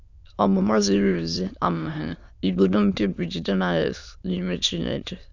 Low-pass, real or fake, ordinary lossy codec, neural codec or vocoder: 7.2 kHz; fake; none; autoencoder, 22.05 kHz, a latent of 192 numbers a frame, VITS, trained on many speakers